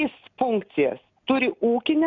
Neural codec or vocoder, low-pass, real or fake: none; 7.2 kHz; real